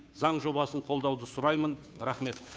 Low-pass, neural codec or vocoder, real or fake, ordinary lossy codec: none; codec, 16 kHz, 2 kbps, FunCodec, trained on Chinese and English, 25 frames a second; fake; none